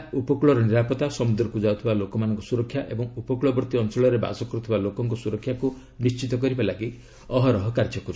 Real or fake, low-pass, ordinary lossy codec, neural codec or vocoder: real; none; none; none